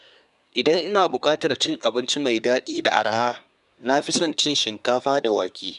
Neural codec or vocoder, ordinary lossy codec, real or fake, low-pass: codec, 24 kHz, 1 kbps, SNAC; none; fake; 10.8 kHz